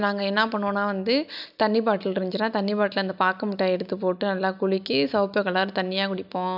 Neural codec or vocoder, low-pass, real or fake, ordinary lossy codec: none; 5.4 kHz; real; none